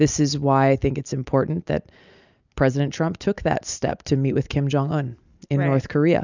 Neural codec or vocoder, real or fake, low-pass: none; real; 7.2 kHz